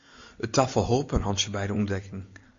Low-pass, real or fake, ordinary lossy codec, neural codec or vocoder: 7.2 kHz; real; MP3, 48 kbps; none